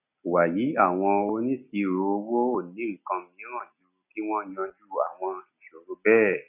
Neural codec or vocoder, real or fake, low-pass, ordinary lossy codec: none; real; 3.6 kHz; none